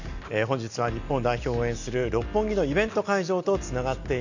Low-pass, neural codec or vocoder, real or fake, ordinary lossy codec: 7.2 kHz; none; real; none